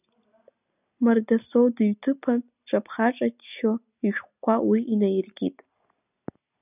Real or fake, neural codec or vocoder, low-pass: real; none; 3.6 kHz